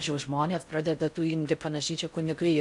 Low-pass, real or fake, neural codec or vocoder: 10.8 kHz; fake; codec, 16 kHz in and 24 kHz out, 0.6 kbps, FocalCodec, streaming, 4096 codes